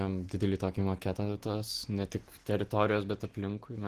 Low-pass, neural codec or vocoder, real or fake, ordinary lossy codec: 14.4 kHz; none; real; Opus, 16 kbps